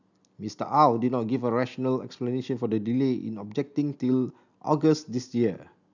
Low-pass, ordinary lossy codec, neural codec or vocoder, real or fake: 7.2 kHz; none; none; real